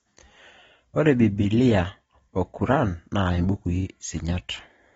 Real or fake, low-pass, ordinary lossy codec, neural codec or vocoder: real; 19.8 kHz; AAC, 24 kbps; none